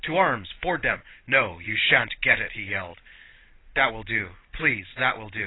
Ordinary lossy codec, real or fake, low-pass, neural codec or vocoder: AAC, 16 kbps; real; 7.2 kHz; none